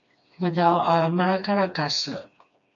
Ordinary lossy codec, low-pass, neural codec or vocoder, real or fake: AAC, 64 kbps; 7.2 kHz; codec, 16 kHz, 2 kbps, FreqCodec, smaller model; fake